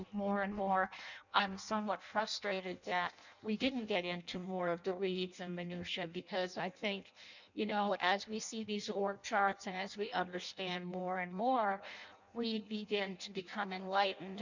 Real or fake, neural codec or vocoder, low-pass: fake; codec, 16 kHz in and 24 kHz out, 0.6 kbps, FireRedTTS-2 codec; 7.2 kHz